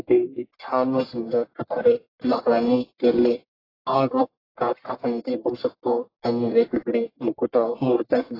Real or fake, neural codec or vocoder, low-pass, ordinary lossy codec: fake; codec, 44.1 kHz, 1.7 kbps, Pupu-Codec; 5.4 kHz; AAC, 24 kbps